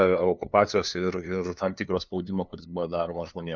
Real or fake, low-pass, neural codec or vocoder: fake; 7.2 kHz; codec, 16 kHz, 2 kbps, FunCodec, trained on LibriTTS, 25 frames a second